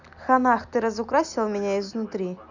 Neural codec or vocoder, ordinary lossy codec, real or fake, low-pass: none; none; real; 7.2 kHz